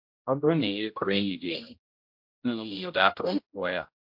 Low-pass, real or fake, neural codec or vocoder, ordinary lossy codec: 5.4 kHz; fake; codec, 16 kHz, 0.5 kbps, X-Codec, HuBERT features, trained on general audio; MP3, 48 kbps